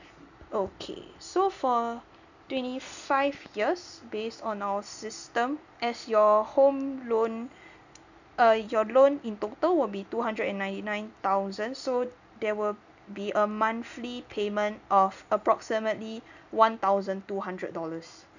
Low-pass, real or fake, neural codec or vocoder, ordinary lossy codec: 7.2 kHz; real; none; none